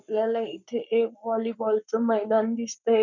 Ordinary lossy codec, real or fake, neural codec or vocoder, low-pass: none; fake; codec, 44.1 kHz, 7.8 kbps, Pupu-Codec; 7.2 kHz